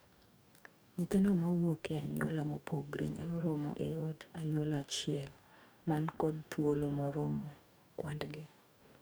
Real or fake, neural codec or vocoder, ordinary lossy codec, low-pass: fake; codec, 44.1 kHz, 2.6 kbps, DAC; none; none